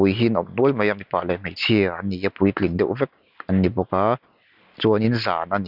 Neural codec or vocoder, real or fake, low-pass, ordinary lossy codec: none; real; 5.4 kHz; none